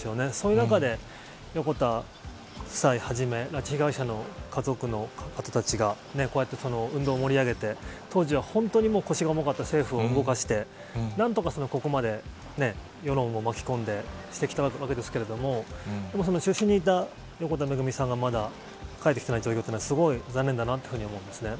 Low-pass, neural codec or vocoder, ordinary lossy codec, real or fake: none; none; none; real